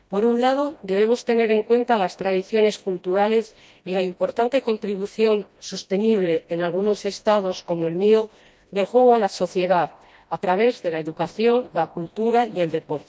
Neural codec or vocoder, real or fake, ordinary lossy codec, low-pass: codec, 16 kHz, 1 kbps, FreqCodec, smaller model; fake; none; none